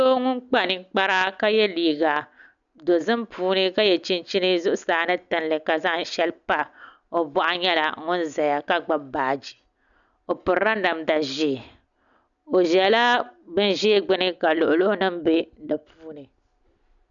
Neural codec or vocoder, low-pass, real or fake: none; 7.2 kHz; real